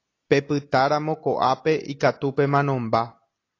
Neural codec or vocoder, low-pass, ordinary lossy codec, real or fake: none; 7.2 kHz; AAC, 32 kbps; real